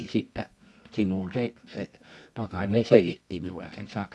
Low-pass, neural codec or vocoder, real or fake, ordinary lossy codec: none; codec, 24 kHz, 0.9 kbps, WavTokenizer, medium music audio release; fake; none